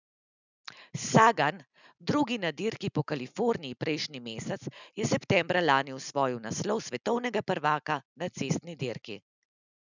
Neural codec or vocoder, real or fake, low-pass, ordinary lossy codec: none; real; 7.2 kHz; none